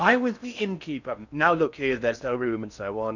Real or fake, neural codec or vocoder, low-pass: fake; codec, 16 kHz in and 24 kHz out, 0.6 kbps, FocalCodec, streaming, 2048 codes; 7.2 kHz